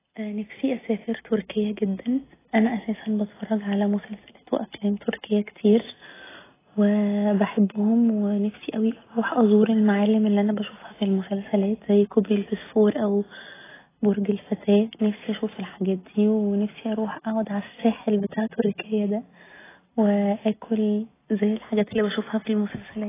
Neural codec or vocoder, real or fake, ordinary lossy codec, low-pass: none; real; AAC, 16 kbps; 3.6 kHz